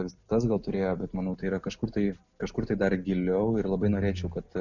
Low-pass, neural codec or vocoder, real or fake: 7.2 kHz; none; real